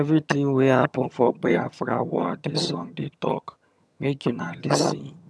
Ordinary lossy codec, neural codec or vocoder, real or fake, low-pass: none; vocoder, 22.05 kHz, 80 mel bands, HiFi-GAN; fake; none